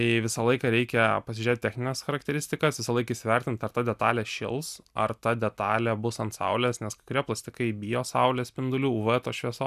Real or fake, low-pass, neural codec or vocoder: real; 14.4 kHz; none